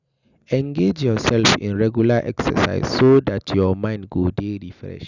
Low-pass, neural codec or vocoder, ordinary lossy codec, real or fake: 7.2 kHz; none; none; real